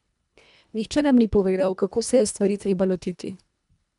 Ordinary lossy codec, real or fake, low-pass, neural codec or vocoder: none; fake; 10.8 kHz; codec, 24 kHz, 1.5 kbps, HILCodec